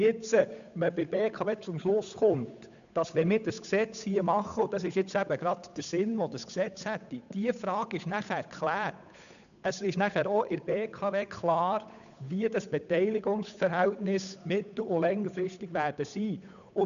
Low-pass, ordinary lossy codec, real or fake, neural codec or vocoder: 7.2 kHz; none; fake; codec, 16 kHz, 8 kbps, FunCodec, trained on Chinese and English, 25 frames a second